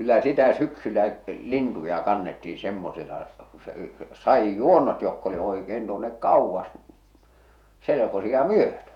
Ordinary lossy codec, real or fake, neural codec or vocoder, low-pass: none; fake; vocoder, 48 kHz, 128 mel bands, Vocos; 19.8 kHz